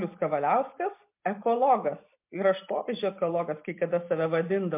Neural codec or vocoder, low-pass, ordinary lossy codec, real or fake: none; 3.6 kHz; MP3, 32 kbps; real